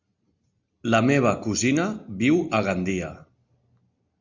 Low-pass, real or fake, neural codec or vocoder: 7.2 kHz; real; none